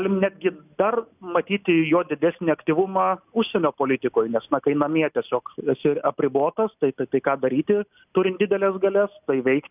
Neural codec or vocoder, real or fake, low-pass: none; real; 3.6 kHz